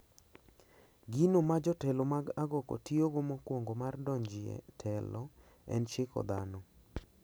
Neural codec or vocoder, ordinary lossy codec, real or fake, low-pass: none; none; real; none